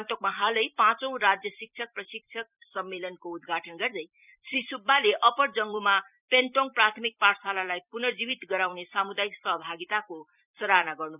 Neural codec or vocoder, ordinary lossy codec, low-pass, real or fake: vocoder, 44.1 kHz, 128 mel bands every 256 samples, BigVGAN v2; none; 3.6 kHz; fake